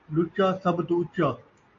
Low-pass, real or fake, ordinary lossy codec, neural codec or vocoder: 7.2 kHz; real; AAC, 48 kbps; none